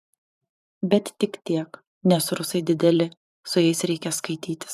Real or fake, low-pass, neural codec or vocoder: fake; 14.4 kHz; vocoder, 44.1 kHz, 128 mel bands every 512 samples, BigVGAN v2